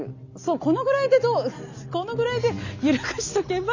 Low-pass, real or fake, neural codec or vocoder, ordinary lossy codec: 7.2 kHz; real; none; none